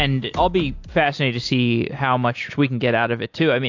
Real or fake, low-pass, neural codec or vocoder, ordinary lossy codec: real; 7.2 kHz; none; AAC, 48 kbps